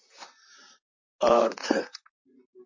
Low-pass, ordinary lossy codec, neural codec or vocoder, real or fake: 7.2 kHz; MP3, 32 kbps; vocoder, 44.1 kHz, 128 mel bands, Pupu-Vocoder; fake